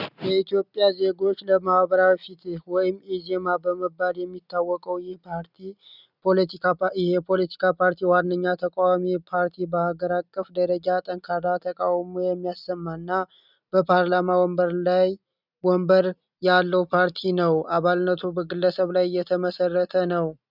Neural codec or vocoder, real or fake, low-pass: none; real; 5.4 kHz